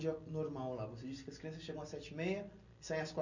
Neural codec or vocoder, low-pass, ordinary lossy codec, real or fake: none; 7.2 kHz; none; real